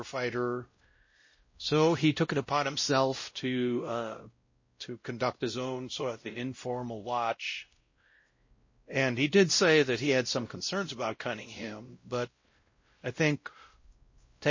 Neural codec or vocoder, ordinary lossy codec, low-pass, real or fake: codec, 16 kHz, 0.5 kbps, X-Codec, WavLM features, trained on Multilingual LibriSpeech; MP3, 32 kbps; 7.2 kHz; fake